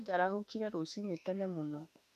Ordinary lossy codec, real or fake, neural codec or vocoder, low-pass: none; fake; autoencoder, 48 kHz, 32 numbers a frame, DAC-VAE, trained on Japanese speech; 14.4 kHz